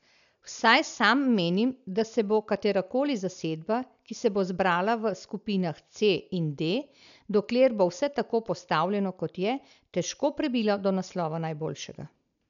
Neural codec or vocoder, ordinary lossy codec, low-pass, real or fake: none; none; 7.2 kHz; real